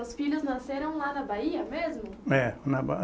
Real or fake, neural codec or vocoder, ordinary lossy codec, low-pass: real; none; none; none